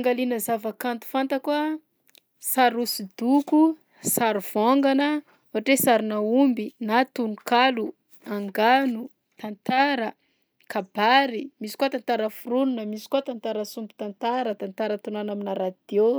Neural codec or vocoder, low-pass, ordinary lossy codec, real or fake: none; none; none; real